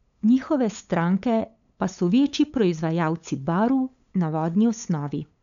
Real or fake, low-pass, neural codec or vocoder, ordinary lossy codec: fake; 7.2 kHz; codec, 16 kHz, 8 kbps, FunCodec, trained on LibriTTS, 25 frames a second; none